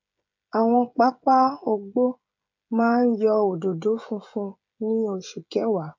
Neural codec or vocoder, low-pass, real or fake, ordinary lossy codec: codec, 16 kHz, 16 kbps, FreqCodec, smaller model; 7.2 kHz; fake; MP3, 64 kbps